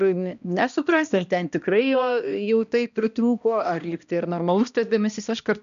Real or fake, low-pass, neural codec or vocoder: fake; 7.2 kHz; codec, 16 kHz, 1 kbps, X-Codec, HuBERT features, trained on balanced general audio